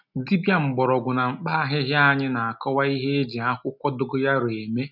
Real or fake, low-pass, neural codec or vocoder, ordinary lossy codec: real; 5.4 kHz; none; none